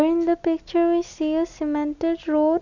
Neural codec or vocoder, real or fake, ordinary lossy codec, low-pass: none; real; none; 7.2 kHz